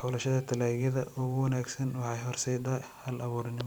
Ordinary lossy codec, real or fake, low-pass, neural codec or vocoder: none; fake; none; vocoder, 44.1 kHz, 128 mel bands every 256 samples, BigVGAN v2